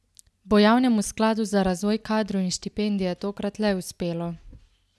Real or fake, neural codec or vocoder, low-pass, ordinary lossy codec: real; none; none; none